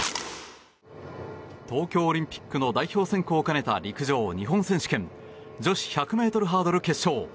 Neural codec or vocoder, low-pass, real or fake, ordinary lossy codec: none; none; real; none